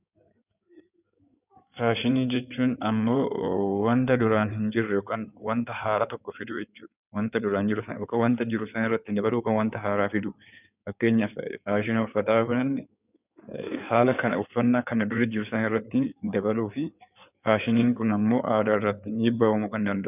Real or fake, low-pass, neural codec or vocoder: fake; 3.6 kHz; codec, 16 kHz in and 24 kHz out, 2.2 kbps, FireRedTTS-2 codec